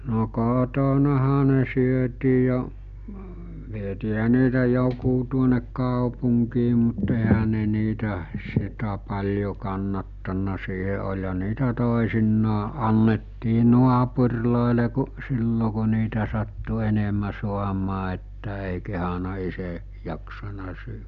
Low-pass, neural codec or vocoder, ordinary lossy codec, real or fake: 7.2 kHz; none; none; real